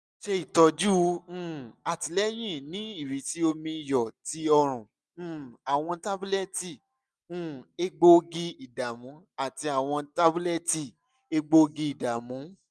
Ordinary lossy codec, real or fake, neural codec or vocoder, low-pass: none; real; none; none